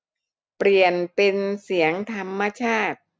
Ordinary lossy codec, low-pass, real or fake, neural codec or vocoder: none; none; real; none